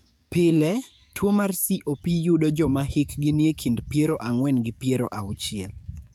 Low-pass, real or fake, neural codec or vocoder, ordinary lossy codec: 19.8 kHz; fake; codec, 44.1 kHz, 7.8 kbps, DAC; none